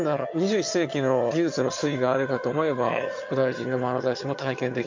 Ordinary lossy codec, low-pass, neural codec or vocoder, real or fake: MP3, 48 kbps; 7.2 kHz; vocoder, 22.05 kHz, 80 mel bands, HiFi-GAN; fake